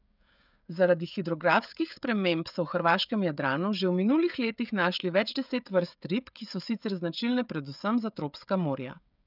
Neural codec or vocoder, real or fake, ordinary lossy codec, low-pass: codec, 16 kHz, 16 kbps, FreqCodec, smaller model; fake; none; 5.4 kHz